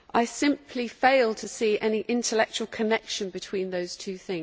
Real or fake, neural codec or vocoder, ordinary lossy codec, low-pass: real; none; none; none